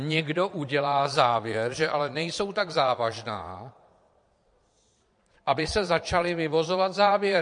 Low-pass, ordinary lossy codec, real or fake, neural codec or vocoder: 9.9 kHz; MP3, 48 kbps; fake; vocoder, 22.05 kHz, 80 mel bands, Vocos